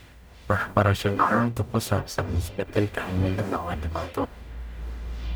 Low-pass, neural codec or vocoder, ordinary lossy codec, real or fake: none; codec, 44.1 kHz, 0.9 kbps, DAC; none; fake